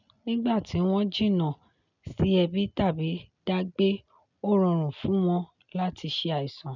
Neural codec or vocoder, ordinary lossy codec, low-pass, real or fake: none; none; 7.2 kHz; real